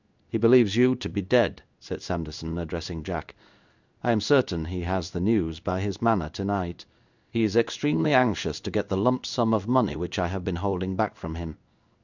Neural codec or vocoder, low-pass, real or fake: codec, 16 kHz in and 24 kHz out, 1 kbps, XY-Tokenizer; 7.2 kHz; fake